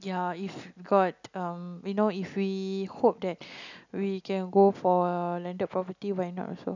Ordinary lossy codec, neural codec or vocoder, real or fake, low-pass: none; none; real; 7.2 kHz